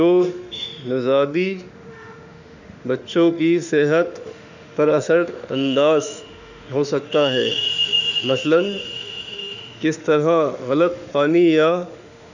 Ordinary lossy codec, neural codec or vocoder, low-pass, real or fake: none; autoencoder, 48 kHz, 32 numbers a frame, DAC-VAE, trained on Japanese speech; 7.2 kHz; fake